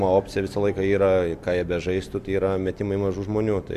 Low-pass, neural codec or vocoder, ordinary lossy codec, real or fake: 14.4 kHz; vocoder, 44.1 kHz, 128 mel bands every 256 samples, BigVGAN v2; MP3, 96 kbps; fake